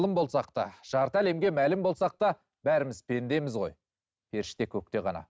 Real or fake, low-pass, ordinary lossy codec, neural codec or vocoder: real; none; none; none